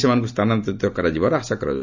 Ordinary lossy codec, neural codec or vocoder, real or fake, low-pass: none; none; real; 7.2 kHz